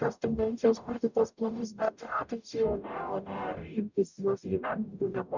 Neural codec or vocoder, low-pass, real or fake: codec, 44.1 kHz, 0.9 kbps, DAC; 7.2 kHz; fake